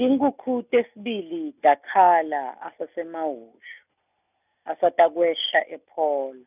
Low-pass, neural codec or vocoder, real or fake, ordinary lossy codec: 3.6 kHz; none; real; none